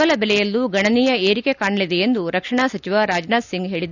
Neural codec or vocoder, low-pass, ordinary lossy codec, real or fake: none; 7.2 kHz; none; real